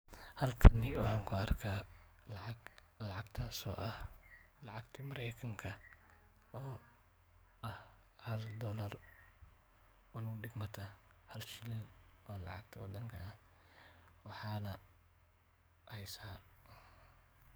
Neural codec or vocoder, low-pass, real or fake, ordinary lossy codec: codec, 44.1 kHz, 7.8 kbps, DAC; none; fake; none